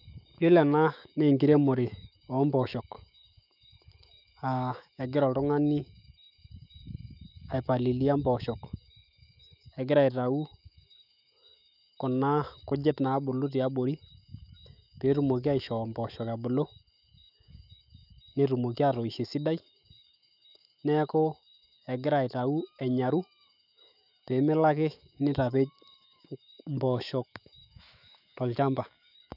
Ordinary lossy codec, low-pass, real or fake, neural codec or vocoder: none; 5.4 kHz; fake; autoencoder, 48 kHz, 128 numbers a frame, DAC-VAE, trained on Japanese speech